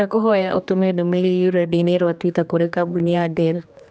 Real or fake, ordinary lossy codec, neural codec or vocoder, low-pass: fake; none; codec, 16 kHz, 2 kbps, X-Codec, HuBERT features, trained on general audio; none